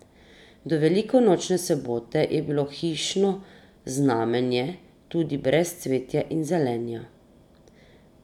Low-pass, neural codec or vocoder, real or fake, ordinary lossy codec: 19.8 kHz; none; real; none